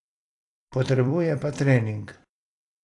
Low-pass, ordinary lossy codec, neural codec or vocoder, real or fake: 10.8 kHz; AAC, 48 kbps; vocoder, 44.1 kHz, 128 mel bands every 256 samples, BigVGAN v2; fake